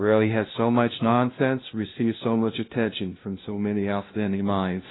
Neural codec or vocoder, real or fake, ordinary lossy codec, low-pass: codec, 16 kHz, 0.5 kbps, FunCodec, trained on LibriTTS, 25 frames a second; fake; AAC, 16 kbps; 7.2 kHz